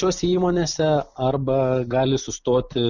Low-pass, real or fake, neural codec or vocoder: 7.2 kHz; real; none